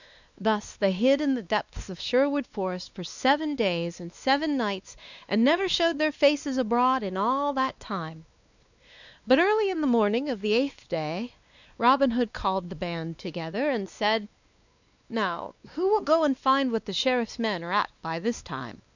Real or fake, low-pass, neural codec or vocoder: fake; 7.2 kHz; codec, 16 kHz, 2 kbps, X-Codec, WavLM features, trained on Multilingual LibriSpeech